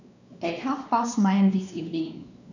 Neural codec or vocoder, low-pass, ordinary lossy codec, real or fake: codec, 16 kHz, 2 kbps, X-Codec, WavLM features, trained on Multilingual LibriSpeech; 7.2 kHz; none; fake